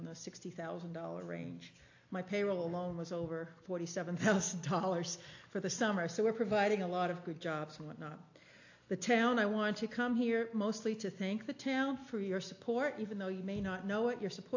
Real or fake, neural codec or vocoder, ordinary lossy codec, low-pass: real; none; AAC, 48 kbps; 7.2 kHz